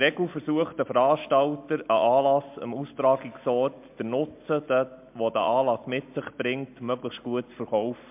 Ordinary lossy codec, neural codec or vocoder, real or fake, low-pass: MP3, 32 kbps; none; real; 3.6 kHz